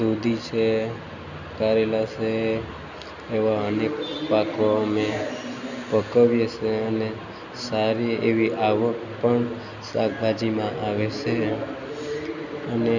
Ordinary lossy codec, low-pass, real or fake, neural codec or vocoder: none; 7.2 kHz; real; none